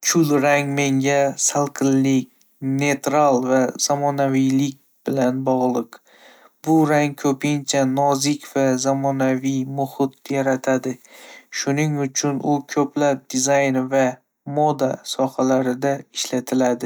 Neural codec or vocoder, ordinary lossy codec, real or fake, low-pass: none; none; real; none